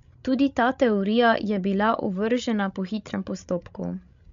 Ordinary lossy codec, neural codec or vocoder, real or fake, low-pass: MP3, 64 kbps; codec, 16 kHz, 16 kbps, FreqCodec, larger model; fake; 7.2 kHz